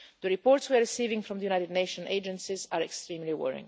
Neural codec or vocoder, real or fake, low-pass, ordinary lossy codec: none; real; none; none